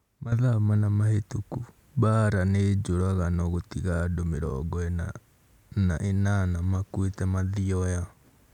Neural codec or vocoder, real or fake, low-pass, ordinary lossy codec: none; real; 19.8 kHz; none